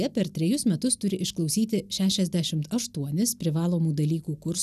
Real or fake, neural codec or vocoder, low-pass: real; none; 14.4 kHz